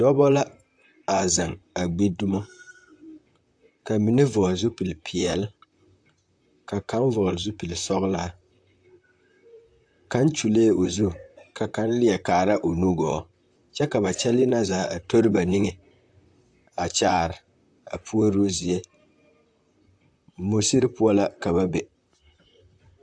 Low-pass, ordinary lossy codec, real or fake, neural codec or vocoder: 9.9 kHz; AAC, 64 kbps; fake; vocoder, 44.1 kHz, 128 mel bands, Pupu-Vocoder